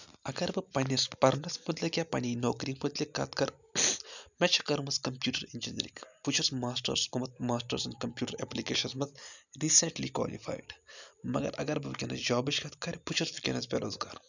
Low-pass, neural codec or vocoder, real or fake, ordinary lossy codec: 7.2 kHz; none; real; none